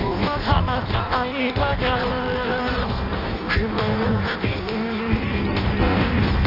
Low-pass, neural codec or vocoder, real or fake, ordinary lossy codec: 5.4 kHz; codec, 16 kHz in and 24 kHz out, 0.6 kbps, FireRedTTS-2 codec; fake; none